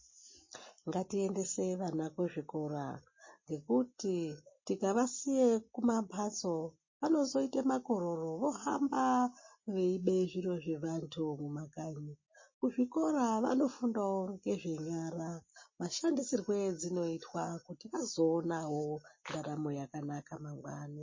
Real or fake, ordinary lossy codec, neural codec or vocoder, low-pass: real; MP3, 32 kbps; none; 7.2 kHz